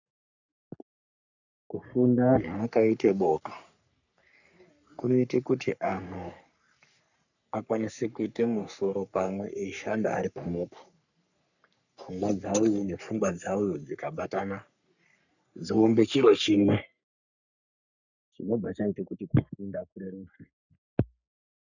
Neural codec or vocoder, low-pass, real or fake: codec, 44.1 kHz, 3.4 kbps, Pupu-Codec; 7.2 kHz; fake